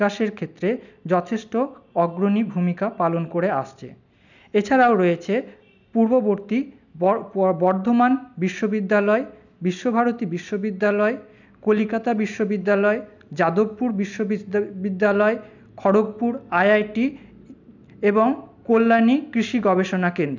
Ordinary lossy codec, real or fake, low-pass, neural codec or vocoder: none; real; 7.2 kHz; none